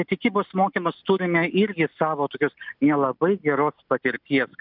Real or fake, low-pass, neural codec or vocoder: real; 5.4 kHz; none